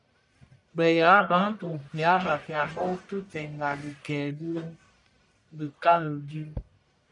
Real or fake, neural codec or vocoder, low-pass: fake; codec, 44.1 kHz, 1.7 kbps, Pupu-Codec; 10.8 kHz